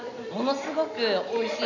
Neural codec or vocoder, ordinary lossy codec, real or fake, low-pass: none; none; real; 7.2 kHz